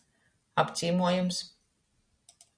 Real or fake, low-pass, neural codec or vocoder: real; 9.9 kHz; none